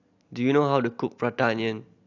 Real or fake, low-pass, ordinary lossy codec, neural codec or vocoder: real; 7.2 kHz; AAC, 48 kbps; none